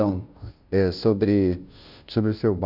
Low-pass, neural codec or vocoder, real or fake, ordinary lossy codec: 5.4 kHz; codec, 16 kHz, 0.5 kbps, FunCodec, trained on Chinese and English, 25 frames a second; fake; none